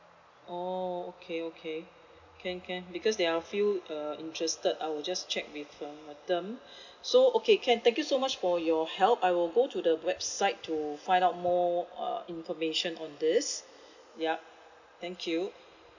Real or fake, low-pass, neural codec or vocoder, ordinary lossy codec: real; 7.2 kHz; none; none